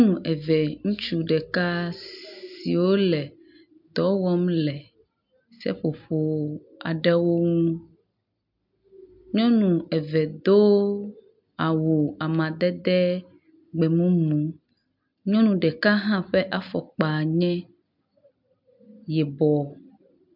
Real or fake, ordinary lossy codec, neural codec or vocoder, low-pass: real; MP3, 48 kbps; none; 5.4 kHz